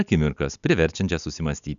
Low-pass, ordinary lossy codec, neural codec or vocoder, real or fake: 7.2 kHz; MP3, 96 kbps; none; real